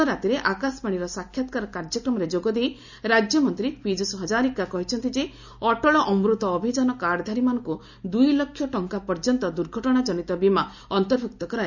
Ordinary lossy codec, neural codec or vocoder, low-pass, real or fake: none; none; 7.2 kHz; real